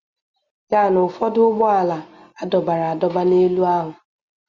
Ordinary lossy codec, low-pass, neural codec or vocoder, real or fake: Opus, 64 kbps; 7.2 kHz; none; real